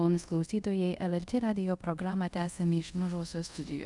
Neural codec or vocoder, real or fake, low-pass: codec, 24 kHz, 0.5 kbps, DualCodec; fake; 10.8 kHz